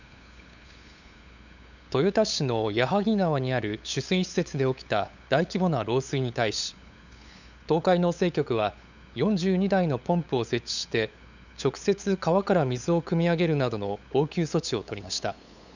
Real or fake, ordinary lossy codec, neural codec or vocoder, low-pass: fake; none; codec, 16 kHz, 8 kbps, FunCodec, trained on LibriTTS, 25 frames a second; 7.2 kHz